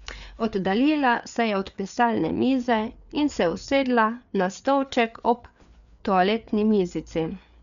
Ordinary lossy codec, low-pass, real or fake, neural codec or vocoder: none; 7.2 kHz; fake; codec, 16 kHz, 4 kbps, FreqCodec, larger model